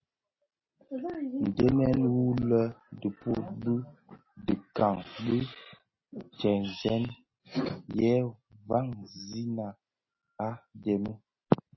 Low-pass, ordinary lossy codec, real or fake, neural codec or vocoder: 7.2 kHz; MP3, 24 kbps; real; none